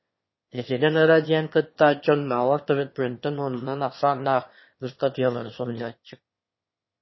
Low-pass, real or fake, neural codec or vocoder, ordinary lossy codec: 7.2 kHz; fake; autoencoder, 22.05 kHz, a latent of 192 numbers a frame, VITS, trained on one speaker; MP3, 24 kbps